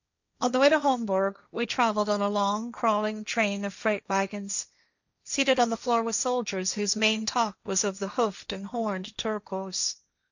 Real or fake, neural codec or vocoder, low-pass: fake; codec, 16 kHz, 1.1 kbps, Voila-Tokenizer; 7.2 kHz